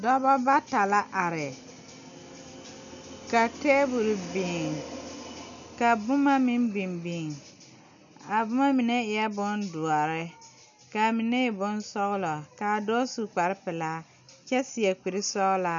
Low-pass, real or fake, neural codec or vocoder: 7.2 kHz; real; none